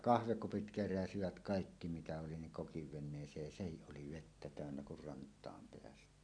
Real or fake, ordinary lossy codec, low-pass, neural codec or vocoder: real; none; 9.9 kHz; none